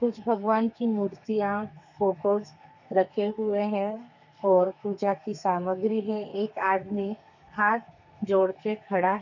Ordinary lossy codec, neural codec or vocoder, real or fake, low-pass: none; codec, 32 kHz, 1.9 kbps, SNAC; fake; 7.2 kHz